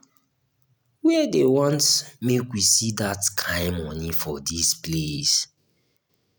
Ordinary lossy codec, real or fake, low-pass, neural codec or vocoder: none; real; none; none